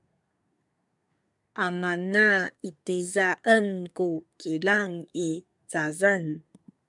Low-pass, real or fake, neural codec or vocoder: 10.8 kHz; fake; codec, 24 kHz, 1 kbps, SNAC